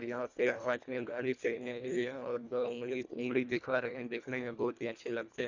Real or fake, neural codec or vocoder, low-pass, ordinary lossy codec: fake; codec, 24 kHz, 1.5 kbps, HILCodec; 7.2 kHz; none